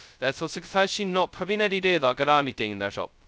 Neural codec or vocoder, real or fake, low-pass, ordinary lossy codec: codec, 16 kHz, 0.2 kbps, FocalCodec; fake; none; none